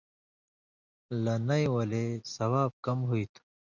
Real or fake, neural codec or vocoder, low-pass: real; none; 7.2 kHz